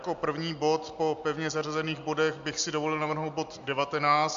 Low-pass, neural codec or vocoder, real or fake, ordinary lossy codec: 7.2 kHz; none; real; MP3, 64 kbps